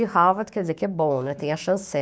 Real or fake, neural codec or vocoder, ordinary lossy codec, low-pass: fake; codec, 16 kHz, 6 kbps, DAC; none; none